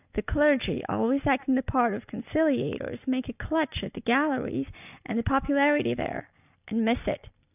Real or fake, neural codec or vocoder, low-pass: real; none; 3.6 kHz